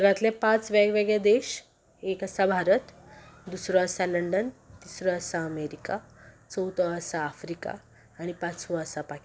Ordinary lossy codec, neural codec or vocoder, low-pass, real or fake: none; none; none; real